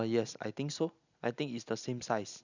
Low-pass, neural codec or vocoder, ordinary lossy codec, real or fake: 7.2 kHz; none; none; real